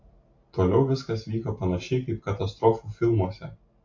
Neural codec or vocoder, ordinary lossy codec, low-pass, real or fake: none; AAC, 48 kbps; 7.2 kHz; real